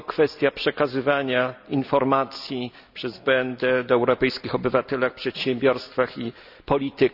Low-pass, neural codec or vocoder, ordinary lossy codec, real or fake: 5.4 kHz; none; none; real